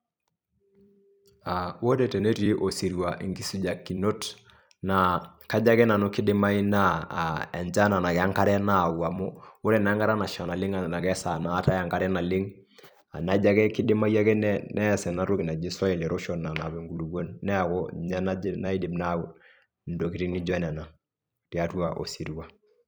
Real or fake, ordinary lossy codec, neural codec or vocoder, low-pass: fake; none; vocoder, 44.1 kHz, 128 mel bands every 256 samples, BigVGAN v2; none